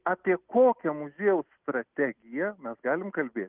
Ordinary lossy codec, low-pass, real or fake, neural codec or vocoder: Opus, 32 kbps; 3.6 kHz; real; none